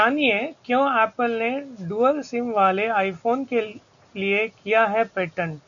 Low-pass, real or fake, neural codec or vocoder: 7.2 kHz; real; none